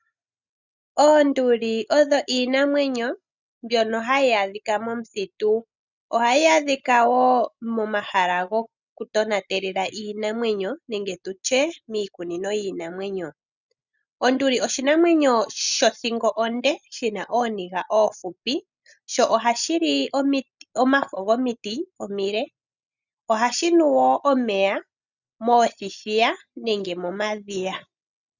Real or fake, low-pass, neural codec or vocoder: real; 7.2 kHz; none